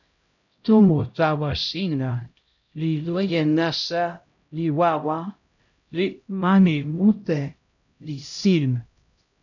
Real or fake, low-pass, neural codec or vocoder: fake; 7.2 kHz; codec, 16 kHz, 0.5 kbps, X-Codec, HuBERT features, trained on LibriSpeech